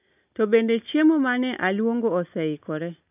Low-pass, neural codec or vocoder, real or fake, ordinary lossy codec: 3.6 kHz; none; real; none